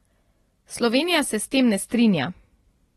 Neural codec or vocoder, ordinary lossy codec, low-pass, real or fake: none; AAC, 32 kbps; 19.8 kHz; real